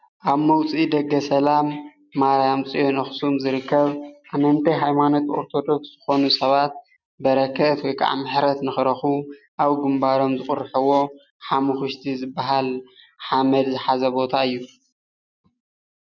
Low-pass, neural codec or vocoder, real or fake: 7.2 kHz; none; real